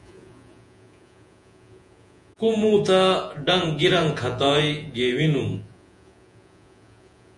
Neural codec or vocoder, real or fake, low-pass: vocoder, 48 kHz, 128 mel bands, Vocos; fake; 10.8 kHz